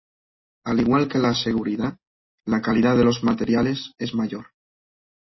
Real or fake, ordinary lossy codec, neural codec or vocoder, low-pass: real; MP3, 24 kbps; none; 7.2 kHz